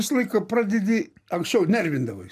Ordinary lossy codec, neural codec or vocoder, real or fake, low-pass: AAC, 96 kbps; none; real; 14.4 kHz